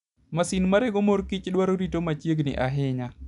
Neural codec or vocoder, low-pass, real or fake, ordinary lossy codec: none; 10.8 kHz; real; none